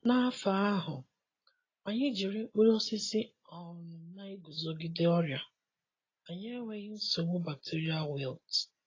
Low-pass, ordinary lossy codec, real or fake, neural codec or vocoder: 7.2 kHz; AAC, 32 kbps; real; none